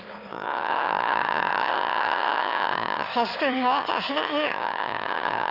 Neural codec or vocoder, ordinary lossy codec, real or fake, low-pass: autoencoder, 22.05 kHz, a latent of 192 numbers a frame, VITS, trained on one speaker; Opus, 32 kbps; fake; 5.4 kHz